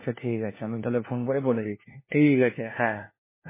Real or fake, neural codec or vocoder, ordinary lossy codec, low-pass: fake; codec, 16 kHz, 1 kbps, FunCodec, trained on LibriTTS, 50 frames a second; MP3, 16 kbps; 3.6 kHz